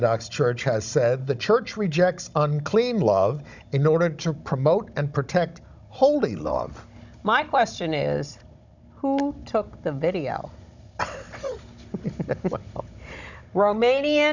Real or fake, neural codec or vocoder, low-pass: fake; codec, 16 kHz, 16 kbps, FunCodec, trained on Chinese and English, 50 frames a second; 7.2 kHz